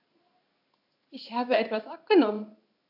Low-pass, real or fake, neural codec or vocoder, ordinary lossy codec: 5.4 kHz; fake; codec, 16 kHz in and 24 kHz out, 1 kbps, XY-Tokenizer; AAC, 48 kbps